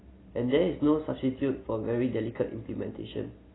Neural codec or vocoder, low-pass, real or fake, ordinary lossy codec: none; 7.2 kHz; real; AAC, 16 kbps